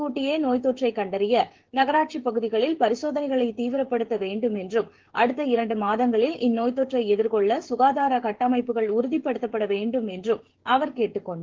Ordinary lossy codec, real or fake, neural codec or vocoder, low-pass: Opus, 16 kbps; fake; codec, 16 kHz, 16 kbps, FreqCodec, smaller model; 7.2 kHz